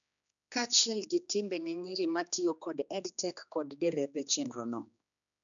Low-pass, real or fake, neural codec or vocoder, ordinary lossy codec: 7.2 kHz; fake; codec, 16 kHz, 2 kbps, X-Codec, HuBERT features, trained on general audio; none